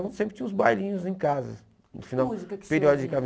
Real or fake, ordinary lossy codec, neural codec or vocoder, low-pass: real; none; none; none